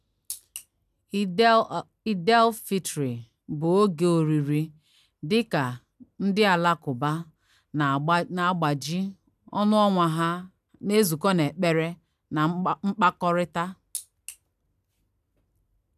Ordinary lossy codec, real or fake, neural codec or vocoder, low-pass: none; real; none; 14.4 kHz